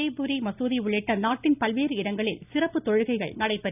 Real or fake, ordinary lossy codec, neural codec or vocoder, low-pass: real; none; none; 3.6 kHz